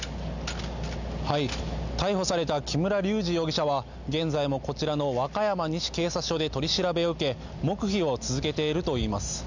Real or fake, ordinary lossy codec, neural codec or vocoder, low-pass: real; none; none; 7.2 kHz